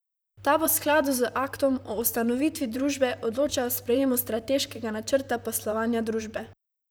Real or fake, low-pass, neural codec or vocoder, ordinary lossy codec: fake; none; vocoder, 44.1 kHz, 128 mel bands, Pupu-Vocoder; none